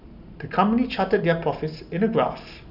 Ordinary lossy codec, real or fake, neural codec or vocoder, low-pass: none; real; none; 5.4 kHz